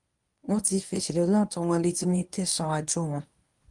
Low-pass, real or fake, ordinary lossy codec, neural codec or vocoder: 10.8 kHz; fake; Opus, 32 kbps; codec, 24 kHz, 0.9 kbps, WavTokenizer, medium speech release version 1